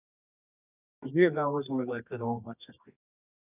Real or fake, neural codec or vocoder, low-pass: fake; codec, 24 kHz, 0.9 kbps, WavTokenizer, medium music audio release; 3.6 kHz